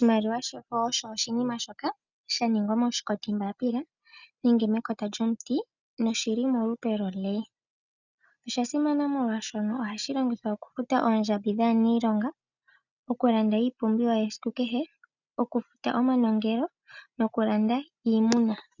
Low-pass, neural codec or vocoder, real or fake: 7.2 kHz; none; real